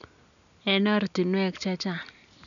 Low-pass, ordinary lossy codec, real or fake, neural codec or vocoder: 7.2 kHz; none; real; none